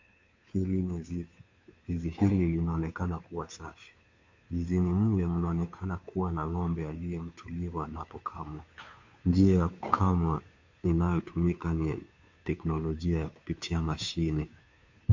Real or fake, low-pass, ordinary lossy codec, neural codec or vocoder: fake; 7.2 kHz; MP3, 48 kbps; codec, 16 kHz, 2 kbps, FunCodec, trained on Chinese and English, 25 frames a second